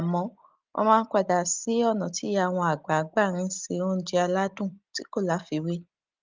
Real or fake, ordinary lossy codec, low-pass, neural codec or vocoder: real; Opus, 32 kbps; 7.2 kHz; none